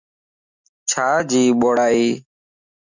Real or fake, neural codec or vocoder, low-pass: real; none; 7.2 kHz